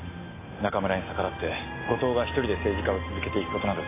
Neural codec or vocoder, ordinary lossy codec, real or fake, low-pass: none; AAC, 16 kbps; real; 3.6 kHz